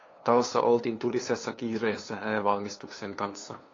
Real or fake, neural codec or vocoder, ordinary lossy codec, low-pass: fake; codec, 16 kHz, 2 kbps, FunCodec, trained on LibriTTS, 25 frames a second; AAC, 32 kbps; 7.2 kHz